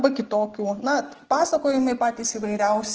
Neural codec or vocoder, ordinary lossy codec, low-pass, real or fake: none; Opus, 16 kbps; 7.2 kHz; real